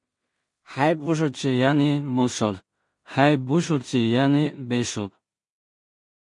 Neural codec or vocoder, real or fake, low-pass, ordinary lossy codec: codec, 16 kHz in and 24 kHz out, 0.4 kbps, LongCat-Audio-Codec, two codebook decoder; fake; 10.8 kHz; MP3, 48 kbps